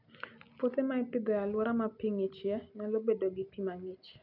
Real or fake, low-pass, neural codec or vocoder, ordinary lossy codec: real; 5.4 kHz; none; none